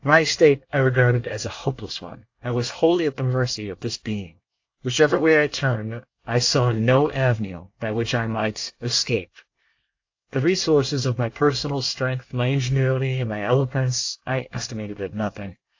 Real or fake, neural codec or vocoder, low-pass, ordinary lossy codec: fake; codec, 24 kHz, 1 kbps, SNAC; 7.2 kHz; AAC, 48 kbps